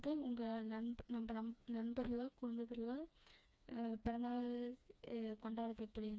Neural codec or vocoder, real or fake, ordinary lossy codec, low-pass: codec, 16 kHz, 2 kbps, FreqCodec, smaller model; fake; none; none